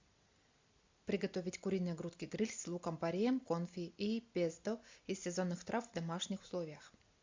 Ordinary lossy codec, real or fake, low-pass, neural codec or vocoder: MP3, 64 kbps; real; 7.2 kHz; none